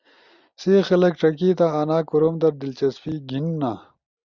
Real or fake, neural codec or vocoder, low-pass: real; none; 7.2 kHz